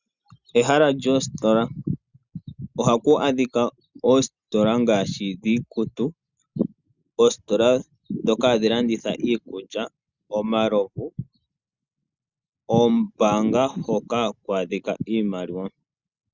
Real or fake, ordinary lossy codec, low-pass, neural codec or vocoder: real; Opus, 64 kbps; 7.2 kHz; none